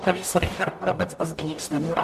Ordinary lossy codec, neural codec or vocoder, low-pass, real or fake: MP3, 64 kbps; codec, 44.1 kHz, 0.9 kbps, DAC; 14.4 kHz; fake